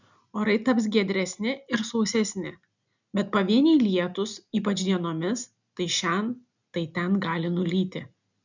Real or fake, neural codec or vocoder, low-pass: real; none; 7.2 kHz